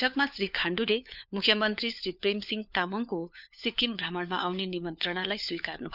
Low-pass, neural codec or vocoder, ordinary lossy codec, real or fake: 5.4 kHz; codec, 16 kHz, 4 kbps, FunCodec, trained on LibriTTS, 50 frames a second; none; fake